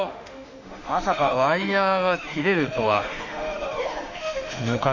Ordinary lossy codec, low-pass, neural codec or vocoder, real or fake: none; 7.2 kHz; autoencoder, 48 kHz, 32 numbers a frame, DAC-VAE, trained on Japanese speech; fake